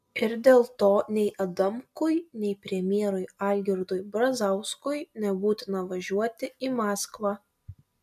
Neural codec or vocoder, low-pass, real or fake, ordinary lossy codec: none; 14.4 kHz; real; MP3, 96 kbps